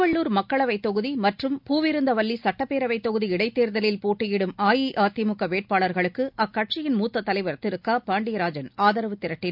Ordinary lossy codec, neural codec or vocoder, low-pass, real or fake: MP3, 48 kbps; none; 5.4 kHz; real